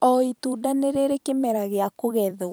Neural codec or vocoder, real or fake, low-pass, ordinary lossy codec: vocoder, 44.1 kHz, 128 mel bands every 256 samples, BigVGAN v2; fake; none; none